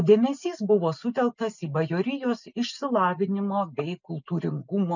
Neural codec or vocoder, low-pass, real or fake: none; 7.2 kHz; real